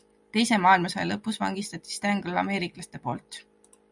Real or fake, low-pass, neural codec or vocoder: real; 10.8 kHz; none